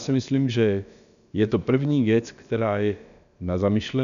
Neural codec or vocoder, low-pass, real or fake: codec, 16 kHz, about 1 kbps, DyCAST, with the encoder's durations; 7.2 kHz; fake